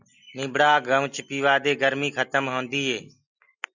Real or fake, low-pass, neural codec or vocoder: real; 7.2 kHz; none